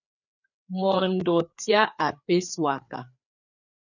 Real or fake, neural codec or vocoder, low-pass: fake; codec, 16 kHz, 4 kbps, FreqCodec, larger model; 7.2 kHz